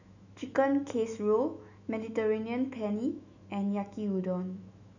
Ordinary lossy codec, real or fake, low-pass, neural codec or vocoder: none; fake; 7.2 kHz; autoencoder, 48 kHz, 128 numbers a frame, DAC-VAE, trained on Japanese speech